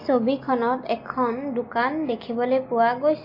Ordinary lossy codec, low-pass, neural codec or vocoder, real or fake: MP3, 32 kbps; 5.4 kHz; none; real